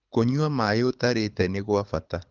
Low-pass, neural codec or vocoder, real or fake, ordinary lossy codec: 7.2 kHz; codec, 24 kHz, 6 kbps, HILCodec; fake; Opus, 24 kbps